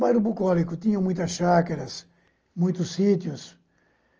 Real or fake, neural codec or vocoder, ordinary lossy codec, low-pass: real; none; Opus, 24 kbps; 7.2 kHz